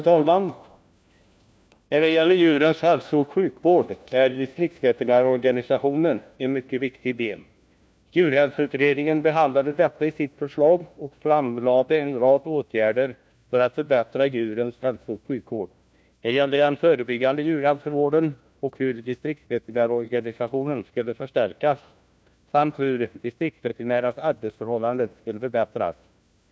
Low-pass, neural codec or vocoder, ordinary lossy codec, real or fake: none; codec, 16 kHz, 1 kbps, FunCodec, trained on LibriTTS, 50 frames a second; none; fake